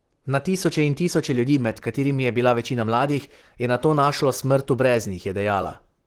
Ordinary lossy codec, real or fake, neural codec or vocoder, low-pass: Opus, 16 kbps; fake; vocoder, 44.1 kHz, 128 mel bands, Pupu-Vocoder; 19.8 kHz